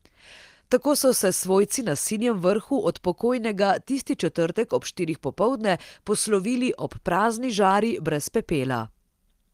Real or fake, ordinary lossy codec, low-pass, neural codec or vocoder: real; Opus, 24 kbps; 14.4 kHz; none